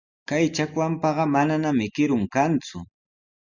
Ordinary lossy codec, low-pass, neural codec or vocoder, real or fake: Opus, 64 kbps; 7.2 kHz; none; real